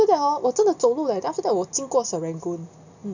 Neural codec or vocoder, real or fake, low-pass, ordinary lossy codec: none; real; 7.2 kHz; none